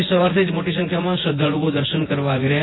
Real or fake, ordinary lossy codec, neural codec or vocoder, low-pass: fake; AAC, 16 kbps; vocoder, 24 kHz, 100 mel bands, Vocos; 7.2 kHz